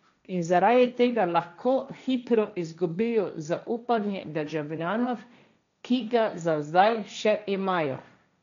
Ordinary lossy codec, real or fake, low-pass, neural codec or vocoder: none; fake; 7.2 kHz; codec, 16 kHz, 1.1 kbps, Voila-Tokenizer